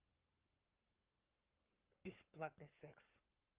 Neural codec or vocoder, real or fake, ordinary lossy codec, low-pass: codec, 44.1 kHz, 7.8 kbps, Pupu-Codec; fake; Opus, 32 kbps; 3.6 kHz